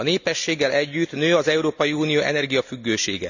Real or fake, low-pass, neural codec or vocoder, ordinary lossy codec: real; 7.2 kHz; none; none